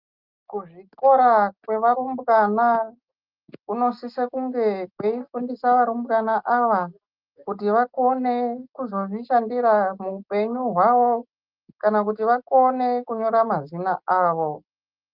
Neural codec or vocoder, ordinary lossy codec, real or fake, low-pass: none; Opus, 24 kbps; real; 5.4 kHz